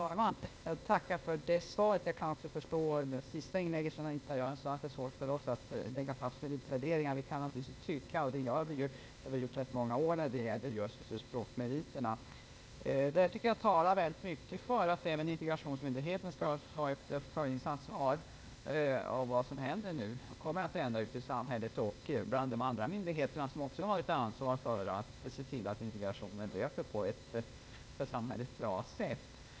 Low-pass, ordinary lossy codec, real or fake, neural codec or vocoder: none; none; fake; codec, 16 kHz, 0.8 kbps, ZipCodec